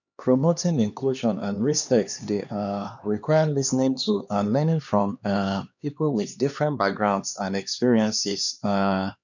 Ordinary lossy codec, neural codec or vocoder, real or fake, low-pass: none; codec, 16 kHz, 2 kbps, X-Codec, HuBERT features, trained on LibriSpeech; fake; 7.2 kHz